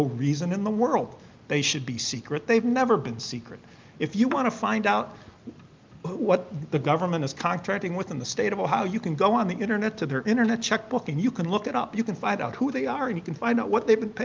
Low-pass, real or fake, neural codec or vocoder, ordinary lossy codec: 7.2 kHz; real; none; Opus, 24 kbps